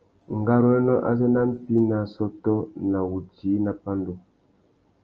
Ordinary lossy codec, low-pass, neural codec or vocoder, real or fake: Opus, 24 kbps; 7.2 kHz; none; real